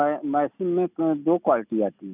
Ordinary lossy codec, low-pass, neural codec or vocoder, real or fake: none; 3.6 kHz; none; real